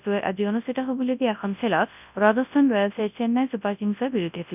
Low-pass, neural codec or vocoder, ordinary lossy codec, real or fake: 3.6 kHz; codec, 24 kHz, 0.9 kbps, WavTokenizer, large speech release; none; fake